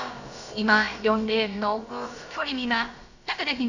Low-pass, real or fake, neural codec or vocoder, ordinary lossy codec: 7.2 kHz; fake; codec, 16 kHz, about 1 kbps, DyCAST, with the encoder's durations; Opus, 64 kbps